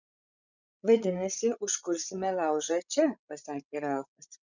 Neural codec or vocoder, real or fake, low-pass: codec, 16 kHz, 16 kbps, FreqCodec, larger model; fake; 7.2 kHz